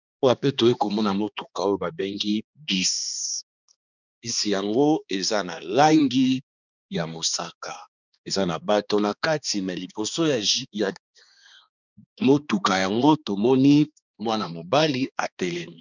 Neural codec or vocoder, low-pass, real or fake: codec, 16 kHz, 2 kbps, X-Codec, HuBERT features, trained on balanced general audio; 7.2 kHz; fake